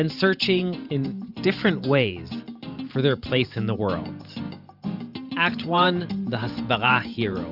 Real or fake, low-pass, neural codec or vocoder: fake; 5.4 kHz; vocoder, 44.1 kHz, 128 mel bands every 512 samples, BigVGAN v2